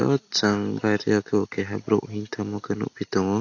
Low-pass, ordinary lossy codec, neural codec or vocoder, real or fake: 7.2 kHz; AAC, 48 kbps; none; real